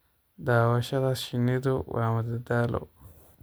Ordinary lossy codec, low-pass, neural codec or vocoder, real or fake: none; none; none; real